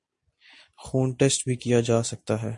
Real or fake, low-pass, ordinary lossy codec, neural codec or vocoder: real; 10.8 kHz; MP3, 48 kbps; none